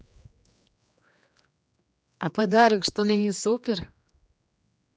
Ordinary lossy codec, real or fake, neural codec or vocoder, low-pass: none; fake; codec, 16 kHz, 2 kbps, X-Codec, HuBERT features, trained on general audio; none